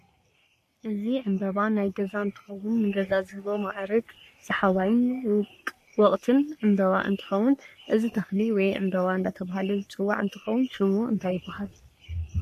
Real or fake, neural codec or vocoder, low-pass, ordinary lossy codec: fake; codec, 44.1 kHz, 3.4 kbps, Pupu-Codec; 14.4 kHz; MP3, 64 kbps